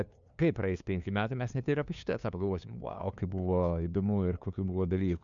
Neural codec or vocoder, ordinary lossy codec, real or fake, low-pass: codec, 16 kHz, 2 kbps, FunCodec, trained on LibriTTS, 25 frames a second; MP3, 96 kbps; fake; 7.2 kHz